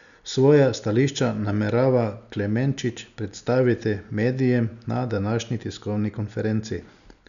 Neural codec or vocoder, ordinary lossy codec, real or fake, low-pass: none; none; real; 7.2 kHz